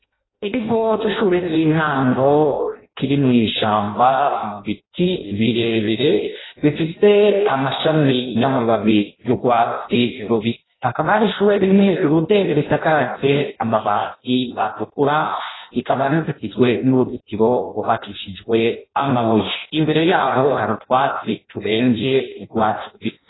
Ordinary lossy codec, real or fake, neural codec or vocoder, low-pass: AAC, 16 kbps; fake; codec, 16 kHz in and 24 kHz out, 0.6 kbps, FireRedTTS-2 codec; 7.2 kHz